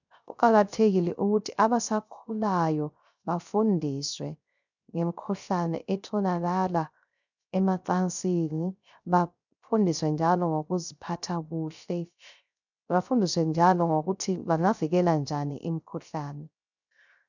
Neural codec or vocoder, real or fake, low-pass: codec, 16 kHz, 0.3 kbps, FocalCodec; fake; 7.2 kHz